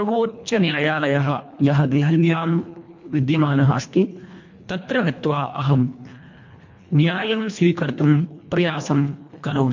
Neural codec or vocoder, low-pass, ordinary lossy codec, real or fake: codec, 24 kHz, 1.5 kbps, HILCodec; 7.2 kHz; MP3, 48 kbps; fake